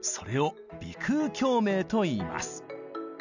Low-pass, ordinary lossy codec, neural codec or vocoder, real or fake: 7.2 kHz; none; none; real